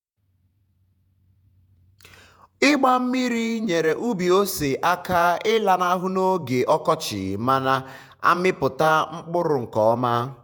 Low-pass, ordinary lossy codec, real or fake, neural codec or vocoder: none; none; fake; vocoder, 48 kHz, 128 mel bands, Vocos